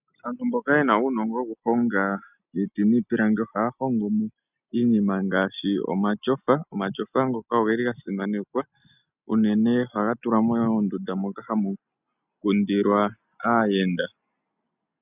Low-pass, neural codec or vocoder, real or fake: 3.6 kHz; none; real